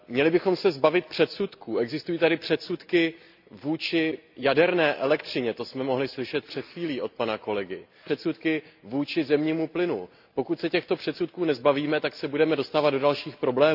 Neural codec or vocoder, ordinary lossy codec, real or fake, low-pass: none; AAC, 48 kbps; real; 5.4 kHz